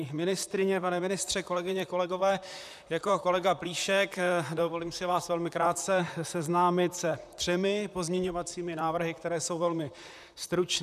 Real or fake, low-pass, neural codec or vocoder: fake; 14.4 kHz; vocoder, 44.1 kHz, 128 mel bands, Pupu-Vocoder